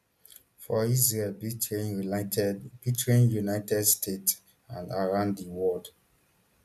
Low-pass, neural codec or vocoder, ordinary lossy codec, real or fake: 14.4 kHz; none; none; real